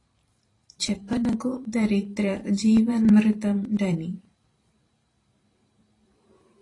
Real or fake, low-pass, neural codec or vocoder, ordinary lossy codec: fake; 10.8 kHz; vocoder, 44.1 kHz, 128 mel bands every 512 samples, BigVGAN v2; AAC, 32 kbps